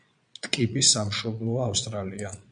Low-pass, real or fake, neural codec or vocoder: 9.9 kHz; fake; vocoder, 22.05 kHz, 80 mel bands, Vocos